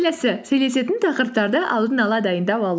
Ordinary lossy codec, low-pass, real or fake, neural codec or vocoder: none; none; real; none